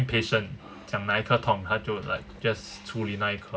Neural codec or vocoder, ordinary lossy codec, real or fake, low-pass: none; none; real; none